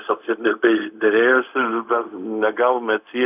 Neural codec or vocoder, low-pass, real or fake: codec, 16 kHz, 0.4 kbps, LongCat-Audio-Codec; 3.6 kHz; fake